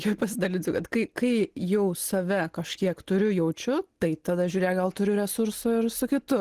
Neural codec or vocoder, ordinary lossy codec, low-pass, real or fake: none; Opus, 16 kbps; 14.4 kHz; real